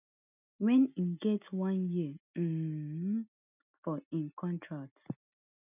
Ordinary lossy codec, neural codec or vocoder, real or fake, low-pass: none; none; real; 3.6 kHz